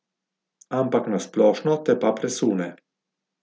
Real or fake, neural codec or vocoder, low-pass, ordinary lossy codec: real; none; none; none